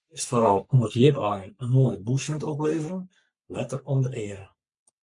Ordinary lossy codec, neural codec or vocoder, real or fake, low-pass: MP3, 64 kbps; codec, 44.1 kHz, 3.4 kbps, Pupu-Codec; fake; 10.8 kHz